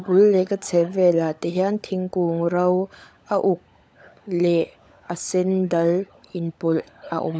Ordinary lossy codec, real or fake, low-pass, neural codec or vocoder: none; fake; none; codec, 16 kHz, 16 kbps, FunCodec, trained on LibriTTS, 50 frames a second